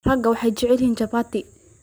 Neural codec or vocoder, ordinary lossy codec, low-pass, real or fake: none; none; none; real